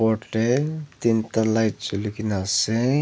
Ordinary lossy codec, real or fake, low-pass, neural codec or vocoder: none; real; none; none